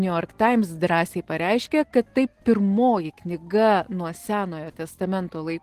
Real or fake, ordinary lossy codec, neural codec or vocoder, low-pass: real; Opus, 16 kbps; none; 14.4 kHz